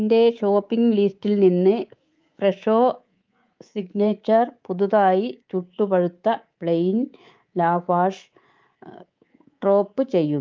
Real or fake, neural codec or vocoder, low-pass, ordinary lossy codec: fake; codec, 24 kHz, 3.1 kbps, DualCodec; 7.2 kHz; Opus, 24 kbps